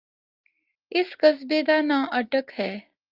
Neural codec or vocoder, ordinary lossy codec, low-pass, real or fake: none; Opus, 24 kbps; 5.4 kHz; real